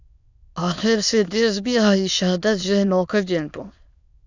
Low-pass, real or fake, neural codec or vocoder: 7.2 kHz; fake; autoencoder, 22.05 kHz, a latent of 192 numbers a frame, VITS, trained on many speakers